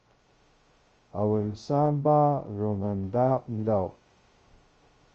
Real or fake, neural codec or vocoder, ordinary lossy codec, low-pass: fake; codec, 16 kHz, 0.2 kbps, FocalCodec; Opus, 24 kbps; 7.2 kHz